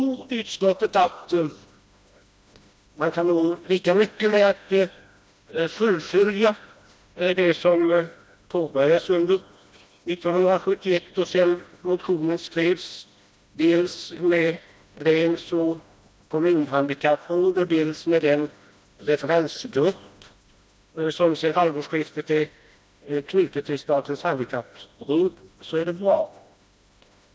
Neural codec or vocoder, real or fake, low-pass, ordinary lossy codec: codec, 16 kHz, 1 kbps, FreqCodec, smaller model; fake; none; none